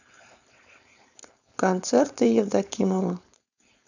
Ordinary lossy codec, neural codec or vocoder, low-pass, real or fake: none; codec, 16 kHz, 4.8 kbps, FACodec; 7.2 kHz; fake